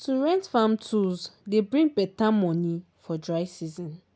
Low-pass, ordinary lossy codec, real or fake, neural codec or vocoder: none; none; real; none